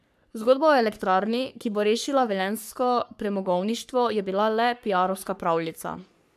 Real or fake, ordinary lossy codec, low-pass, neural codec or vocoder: fake; none; 14.4 kHz; codec, 44.1 kHz, 3.4 kbps, Pupu-Codec